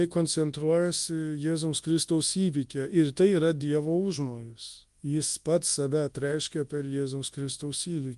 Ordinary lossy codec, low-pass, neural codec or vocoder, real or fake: Opus, 32 kbps; 10.8 kHz; codec, 24 kHz, 0.9 kbps, WavTokenizer, large speech release; fake